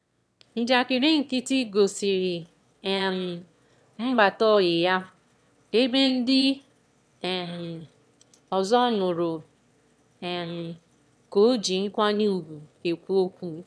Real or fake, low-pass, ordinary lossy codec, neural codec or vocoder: fake; none; none; autoencoder, 22.05 kHz, a latent of 192 numbers a frame, VITS, trained on one speaker